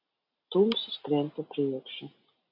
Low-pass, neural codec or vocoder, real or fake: 5.4 kHz; none; real